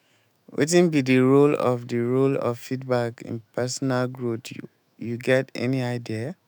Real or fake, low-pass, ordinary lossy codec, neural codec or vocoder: fake; none; none; autoencoder, 48 kHz, 128 numbers a frame, DAC-VAE, trained on Japanese speech